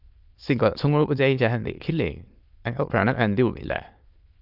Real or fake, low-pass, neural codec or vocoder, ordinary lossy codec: fake; 5.4 kHz; autoencoder, 22.05 kHz, a latent of 192 numbers a frame, VITS, trained on many speakers; Opus, 32 kbps